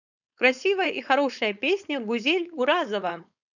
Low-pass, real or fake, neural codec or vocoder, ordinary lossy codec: 7.2 kHz; fake; codec, 16 kHz, 4.8 kbps, FACodec; none